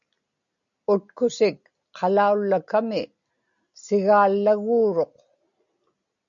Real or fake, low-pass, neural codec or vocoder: real; 7.2 kHz; none